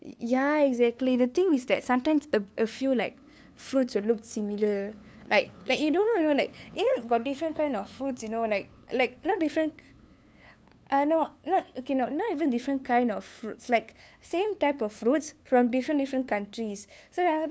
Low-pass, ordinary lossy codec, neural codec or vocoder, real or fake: none; none; codec, 16 kHz, 2 kbps, FunCodec, trained on LibriTTS, 25 frames a second; fake